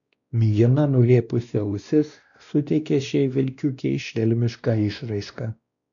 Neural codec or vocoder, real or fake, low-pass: codec, 16 kHz, 1 kbps, X-Codec, WavLM features, trained on Multilingual LibriSpeech; fake; 7.2 kHz